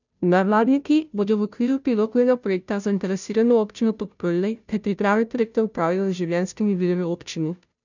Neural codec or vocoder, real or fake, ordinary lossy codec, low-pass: codec, 16 kHz, 0.5 kbps, FunCodec, trained on Chinese and English, 25 frames a second; fake; none; 7.2 kHz